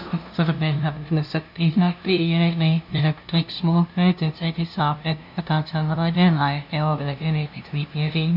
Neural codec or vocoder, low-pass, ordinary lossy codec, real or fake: codec, 16 kHz, 0.5 kbps, FunCodec, trained on LibriTTS, 25 frames a second; 5.4 kHz; none; fake